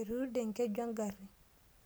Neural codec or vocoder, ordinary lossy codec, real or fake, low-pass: none; none; real; none